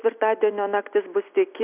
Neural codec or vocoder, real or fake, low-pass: none; real; 3.6 kHz